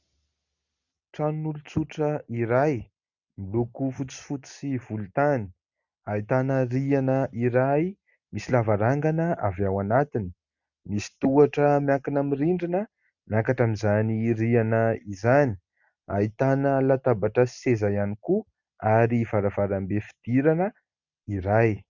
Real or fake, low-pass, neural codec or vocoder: real; 7.2 kHz; none